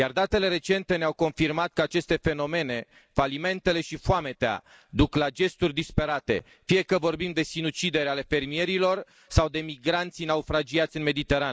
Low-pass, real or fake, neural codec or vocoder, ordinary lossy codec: none; real; none; none